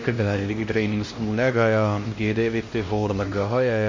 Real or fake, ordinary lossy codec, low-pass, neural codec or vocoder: fake; MP3, 32 kbps; 7.2 kHz; codec, 16 kHz, 1 kbps, X-Codec, HuBERT features, trained on LibriSpeech